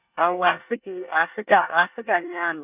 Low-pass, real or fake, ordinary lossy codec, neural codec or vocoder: 3.6 kHz; fake; none; codec, 24 kHz, 1 kbps, SNAC